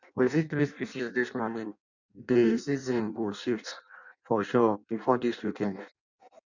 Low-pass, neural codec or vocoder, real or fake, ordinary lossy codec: 7.2 kHz; codec, 16 kHz in and 24 kHz out, 0.6 kbps, FireRedTTS-2 codec; fake; none